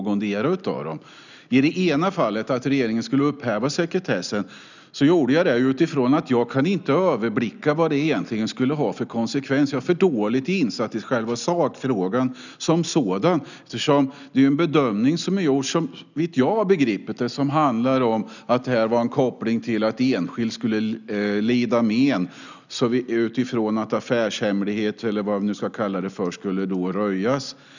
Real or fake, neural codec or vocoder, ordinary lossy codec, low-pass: real; none; none; 7.2 kHz